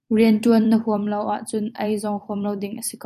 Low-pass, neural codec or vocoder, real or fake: 14.4 kHz; vocoder, 44.1 kHz, 128 mel bands every 256 samples, BigVGAN v2; fake